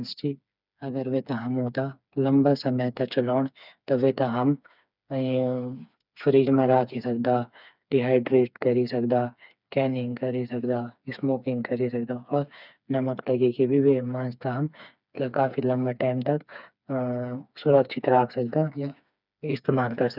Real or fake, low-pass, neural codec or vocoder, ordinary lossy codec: fake; 5.4 kHz; codec, 16 kHz, 4 kbps, FreqCodec, smaller model; none